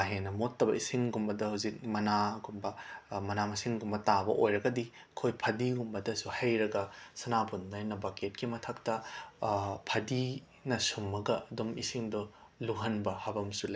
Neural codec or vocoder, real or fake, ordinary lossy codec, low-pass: none; real; none; none